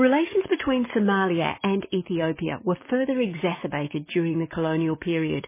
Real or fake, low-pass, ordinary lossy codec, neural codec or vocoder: fake; 3.6 kHz; MP3, 16 kbps; codec, 44.1 kHz, 7.8 kbps, DAC